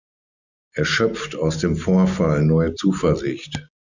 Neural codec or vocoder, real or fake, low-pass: none; real; 7.2 kHz